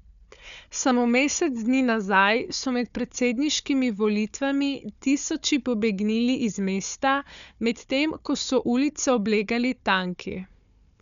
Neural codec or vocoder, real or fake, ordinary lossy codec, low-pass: codec, 16 kHz, 4 kbps, FunCodec, trained on Chinese and English, 50 frames a second; fake; none; 7.2 kHz